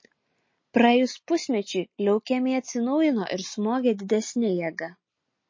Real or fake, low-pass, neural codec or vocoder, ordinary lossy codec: real; 7.2 kHz; none; MP3, 32 kbps